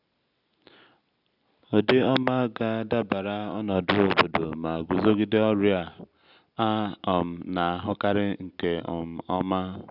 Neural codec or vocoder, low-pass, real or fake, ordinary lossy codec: none; 5.4 kHz; real; none